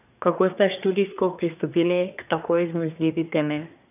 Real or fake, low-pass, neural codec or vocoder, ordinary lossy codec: fake; 3.6 kHz; codec, 24 kHz, 1 kbps, SNAC; none